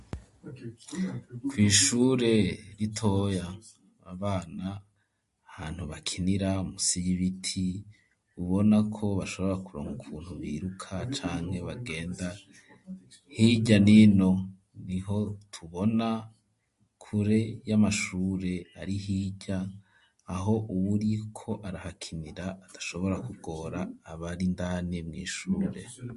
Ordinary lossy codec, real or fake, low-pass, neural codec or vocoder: MP3, 48 kbps; real; 14.4 kHz; none